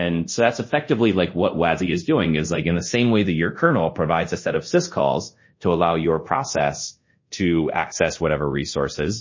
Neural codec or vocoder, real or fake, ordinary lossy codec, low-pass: codec, 24 kHz, 0.5 kbps, DualCodec; fake; MP3, 32 kbps; 7.2 kHz